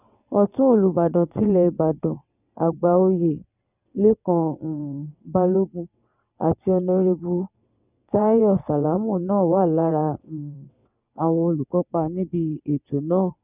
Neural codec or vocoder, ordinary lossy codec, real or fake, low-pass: vocoder, 22.05 kHz, 80 mel bands, WaveNeXt; none; fake; 3.6 kHz